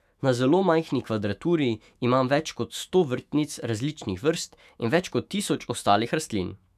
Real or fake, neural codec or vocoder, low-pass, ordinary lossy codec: fake; autoencoder, 48 kHz, 128 numbers a frame, DAC-VAE, trained on Japanese speech; 14.4 kHz; none